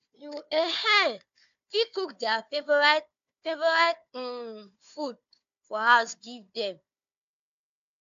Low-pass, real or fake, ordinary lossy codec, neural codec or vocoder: 7.2 kHz; fake; AAC, 64 kbps; codec, 16 kHz, 4 kbps, FunCodec, trained on Chinese and English, 50 frames a second